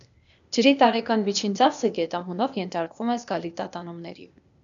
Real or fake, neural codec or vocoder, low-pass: fake; codec, 16 kHz, 0.8 kbps, ZipCodec; 7.2 kHz